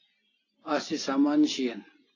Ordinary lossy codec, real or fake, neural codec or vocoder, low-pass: AAC, 32 kbps; real; none; 7.2 kHz